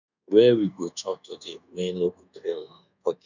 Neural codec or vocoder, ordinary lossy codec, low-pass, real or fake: codec, 24 kHz, 1.2 kbps, DualCodec; none; 7.2 kHz; fake